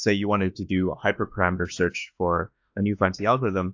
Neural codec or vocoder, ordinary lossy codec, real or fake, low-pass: autoencoder, 48 kHz, 32 numbers a frame, DAC-VAE, trained on Japanese speech; AAC, 48 kbps; fake; 7.2 kHz